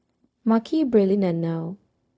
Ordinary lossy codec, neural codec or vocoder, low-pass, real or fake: none; codec, 16 kHz, 0.4 kbps, LongCat-Audio-Codec; none; fake